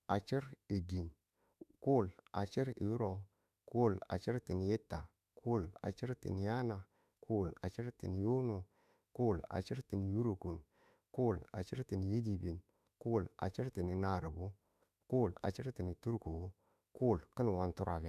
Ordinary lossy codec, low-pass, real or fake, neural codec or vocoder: none; 14.4 kHz; fake; autoencoder, 48 kHz, 32 numbers a frame, DAC-VAE, trained on Japanese speech